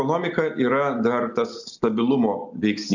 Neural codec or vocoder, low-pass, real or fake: none; 7.2 kHz; real